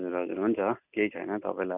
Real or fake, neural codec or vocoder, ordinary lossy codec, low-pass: real; none; none; 3.6 kHz